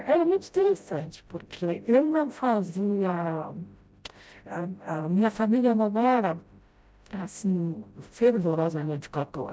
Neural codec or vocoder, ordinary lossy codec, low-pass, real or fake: codec, 16 kHz, 0.5 kbps, FreqCodec, smaller model; none; none; fake